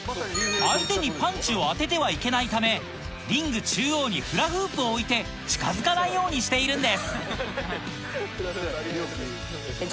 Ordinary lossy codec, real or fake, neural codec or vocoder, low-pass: none; real; none; none